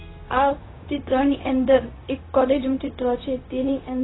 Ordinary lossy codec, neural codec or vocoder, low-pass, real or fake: AAC, 16 kbps; codec, 16 kHz, 0.4 kbps, LongCat-Audio-Codec; 7.2 kHz; fake